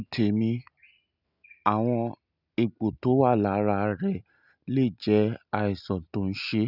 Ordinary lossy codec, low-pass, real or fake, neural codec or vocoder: none; 5.4 kHz; real; none